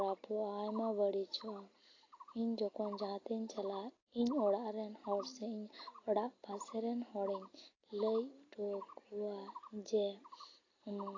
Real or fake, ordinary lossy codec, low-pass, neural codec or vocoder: real; AAC, 32 kbps; 7.2 kHz; none